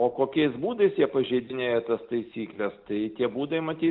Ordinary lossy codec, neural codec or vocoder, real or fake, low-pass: Opus, 32 kbps; none; real; 5.4 kHz